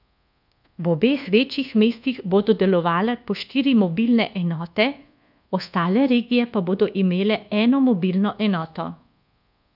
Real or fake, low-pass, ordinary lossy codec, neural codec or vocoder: fake; 5.4 kHz; none; codec, 24 kHz, 1.2 kbps, DualCodec